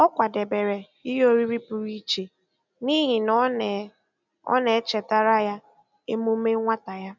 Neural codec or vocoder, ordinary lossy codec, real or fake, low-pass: none; none; real; 7.2 kHz